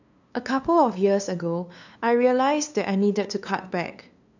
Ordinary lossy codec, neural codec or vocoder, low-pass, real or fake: none; codec, 16 kHz, 2 kbps, FunCodec, trained on LibriTTS, 25 frames a second; 7.2 kHz; fake